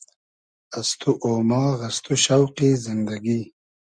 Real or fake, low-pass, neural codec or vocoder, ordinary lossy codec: real; 9.9 kHz; none; Opus, 64 kbps